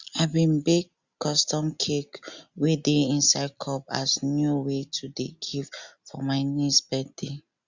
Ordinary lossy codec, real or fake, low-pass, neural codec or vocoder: Opus, 64 kbps; real; 7.2 kHz; none